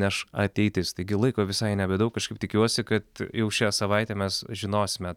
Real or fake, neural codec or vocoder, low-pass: real; none; 19.8 kHz